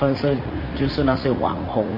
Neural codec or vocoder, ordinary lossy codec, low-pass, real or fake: vocoder, 44.1 kHz, 80 mel bands, Vocos; MP3, 24 kbps; 5.4 kHz; fake